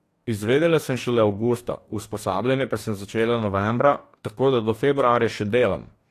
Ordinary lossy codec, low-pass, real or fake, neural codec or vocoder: AAC, 64 kbps; 14.4 kHz; fake; codec, 44.1 kHz, 2.6 kbps, DAC